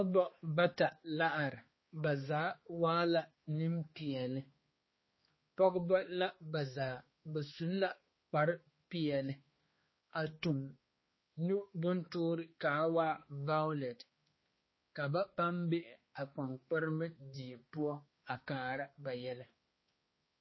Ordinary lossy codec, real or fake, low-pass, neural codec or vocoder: MP3, 24 kbps; fake; 7.2 kHz; codec, 16 kHz, 2 kbps, X-Codec, HuBERT features, trained on balanced general audio